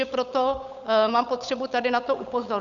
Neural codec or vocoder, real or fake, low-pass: codec, 16 kHz, 8 kbps, FunCodec, trained on Chinese and English, 25 frames a second; fake; 7.2 kHz